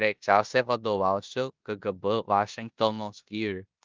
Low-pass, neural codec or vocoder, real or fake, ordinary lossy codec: 7.2 kHz; codec, 16 kHz in and 24 kHz out, 0.9 kbps, LongCat-Audio-Codec, four codebook decoder; fake; Opus, 24 kbps